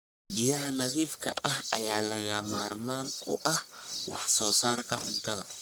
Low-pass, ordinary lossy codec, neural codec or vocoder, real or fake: none; none; codec, 44.1 kHz, 1.7 kbps, Pupu-Codec; fake